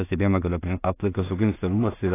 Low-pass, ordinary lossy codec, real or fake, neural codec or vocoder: 3.6 kHz; AAC, 16 kbps; fake; codec, 16 kHz in and 24 kHz out, 0.4 kbps, LongCat-Audio-Codec, two codebook decoder